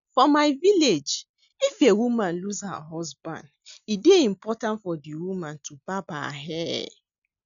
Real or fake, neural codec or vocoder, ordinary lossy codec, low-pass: real; none; none; 7.2 kHz